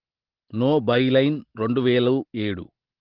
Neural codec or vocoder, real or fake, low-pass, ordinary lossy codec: none; real; 5.4 kHz; Opus, 16 kbps